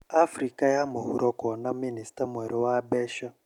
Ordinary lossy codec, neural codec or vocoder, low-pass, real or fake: none; none; 19.8 kHz; real